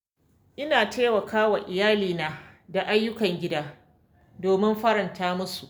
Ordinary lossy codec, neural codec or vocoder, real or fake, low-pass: none; none; real; none